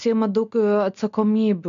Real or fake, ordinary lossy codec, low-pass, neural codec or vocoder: real; MP3, 64 kbps; 7.2 kHz; none